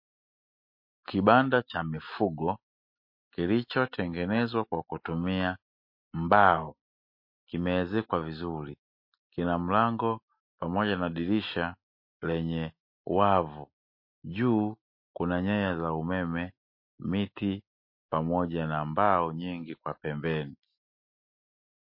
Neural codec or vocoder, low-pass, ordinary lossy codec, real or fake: none; 5.4 kHz; MP3, 32 kbps; real